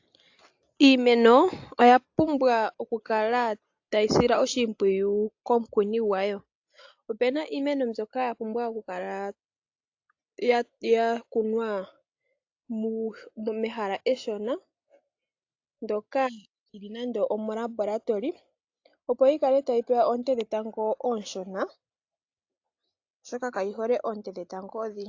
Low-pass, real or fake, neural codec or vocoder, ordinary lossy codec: 7.2 kHz; real; none; AAC, 48 kbps